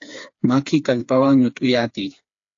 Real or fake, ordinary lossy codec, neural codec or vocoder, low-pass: fake; AAC, 48 kbps; codec, 16 kHz, 4 kbps, FreqCodec, smaller model; 7.2 kHz